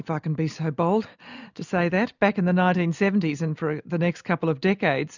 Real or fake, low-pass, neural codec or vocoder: real; 7.2 kHz; none